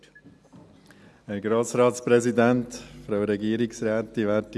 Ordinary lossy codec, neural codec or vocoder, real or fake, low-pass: none; none; real; none